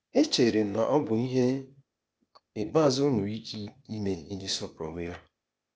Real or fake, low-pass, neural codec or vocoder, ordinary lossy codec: fake; none; codec, 16 kHz, 0.8 kbps, ZipCodec; none